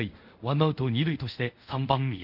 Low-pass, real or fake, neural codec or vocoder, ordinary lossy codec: 5.4 kHz; fake; codec, 24 kHz, 0.5 kbps, DualCodec; none